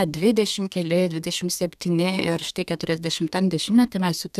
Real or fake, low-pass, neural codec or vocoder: fake; 14.4 kHz; codec, 32 kHz, 1.9 kbps, SNAC